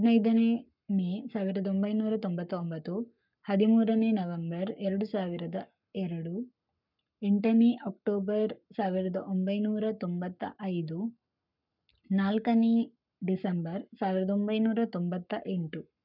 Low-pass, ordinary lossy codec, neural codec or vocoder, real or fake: 5.4 kHz; none; codec, 44.1 kHz, 7.8 kbps, Pupu-Codec; fake